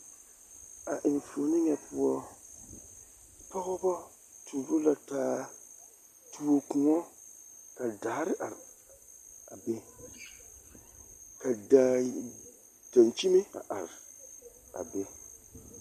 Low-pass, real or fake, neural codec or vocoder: 14.4 kHz; real; none